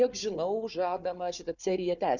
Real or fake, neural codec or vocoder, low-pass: fake; codec, 16 kHz, 4 kbps, FunCodec, trained on Chinese and English, 50 frames a second; 7.2 kHz